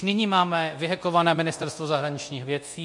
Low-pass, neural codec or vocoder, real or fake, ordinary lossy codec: 10.8 kHz; codec, 24 kHz, 0.9 kbps, DualCodec; fake; MP3, 48 kbps